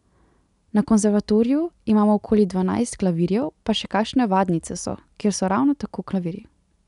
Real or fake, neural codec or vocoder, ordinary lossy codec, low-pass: real; none; none; 10.8 kHz